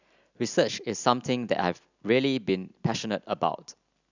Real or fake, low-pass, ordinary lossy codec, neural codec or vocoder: real; 7.2 kHz; none; none